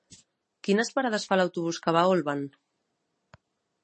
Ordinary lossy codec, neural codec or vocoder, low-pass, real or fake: MP3, 32 kbps; none; 10.8 kHz; real